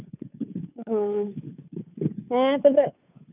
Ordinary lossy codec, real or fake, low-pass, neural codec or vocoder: none; fake; 3.6 kHz; codec, 16 kHz, 2 kbps, FunCodec, trained on Chinese and English, 25 frames a second